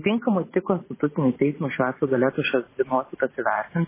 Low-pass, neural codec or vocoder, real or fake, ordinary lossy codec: 3.6 kHz; vocoder, 44.1 kHz, 128 mel bands every 512 samples, BigVGAN v2; fake; MP3, 16 kbps